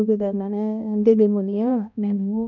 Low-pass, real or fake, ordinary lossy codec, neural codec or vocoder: 7.2 kHz; fake; none; codec, 16 kHz, 1 kbps, X-Codec, HuBERT features, trained on balanced general audio